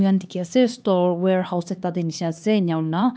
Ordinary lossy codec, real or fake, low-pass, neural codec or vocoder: none; fake; none; codec, 16 kHz, 2 kbps, X-Codec, HuBERT features, trained on LibriSpeech